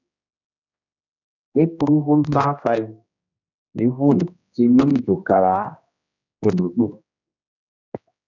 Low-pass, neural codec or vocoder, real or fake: 7.2 kHz; codec, 16 kHz, 1 kbps, X-Codec, HuBERT features, trained on general audio; fake